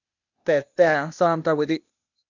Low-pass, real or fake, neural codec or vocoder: 7.2 kHz; fake; codec, 16 kHz, 0.8 kbps, ZipCodec